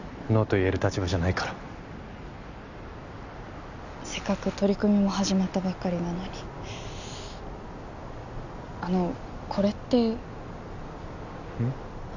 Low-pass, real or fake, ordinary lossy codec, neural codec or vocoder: 7.2 kHz; real; none; none